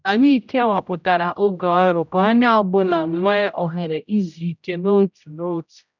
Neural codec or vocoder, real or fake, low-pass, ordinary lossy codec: codec, 16 kHz, 0.5 kbps, X-Codec, HuBERT features, trained on general audio; fake; 7.2 kHz; none